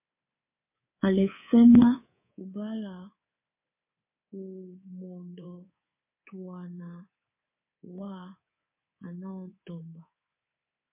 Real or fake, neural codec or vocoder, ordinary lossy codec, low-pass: fake; codec, 24 kHz, 3.1 kbps, DualCodec; MP3, 24 kbps; 3.6 kHz